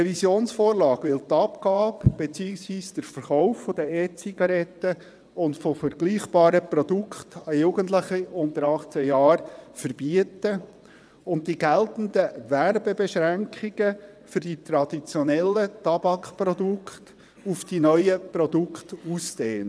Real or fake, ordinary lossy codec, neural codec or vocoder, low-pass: fake; none; vocoder, 22.05 kHz, 80 mel bands, WaveNeXt; none